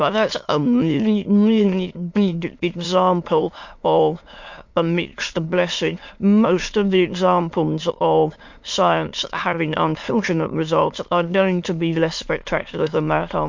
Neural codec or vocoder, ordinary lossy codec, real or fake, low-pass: autoencoder, 22.05 kHz, a latent of 192 numbers a frame, VITS, trained on many speakers; MP3, 48 kbps; fake; 7.2 kHz